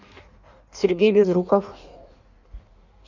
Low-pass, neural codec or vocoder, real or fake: 7.2 kHz; codec, 16 kHz in and 24 kHz out, 1.1 kbps, FireRedTTS-2 codec; fake